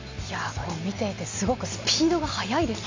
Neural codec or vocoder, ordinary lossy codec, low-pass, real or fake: none; MP3, 64 kbps; 7.2 kHz; real